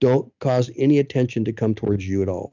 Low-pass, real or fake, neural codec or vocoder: 7.2 kHz; real; none